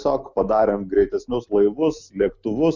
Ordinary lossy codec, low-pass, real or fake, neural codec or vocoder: Opus, 64 kbps; 7.2 kHz; real; none